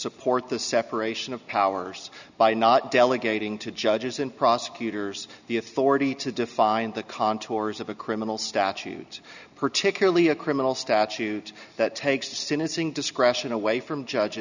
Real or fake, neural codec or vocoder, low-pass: real; none; 7.2 kHz